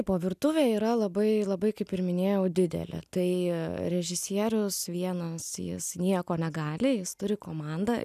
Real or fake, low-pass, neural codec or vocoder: real; 14.4 kHz; none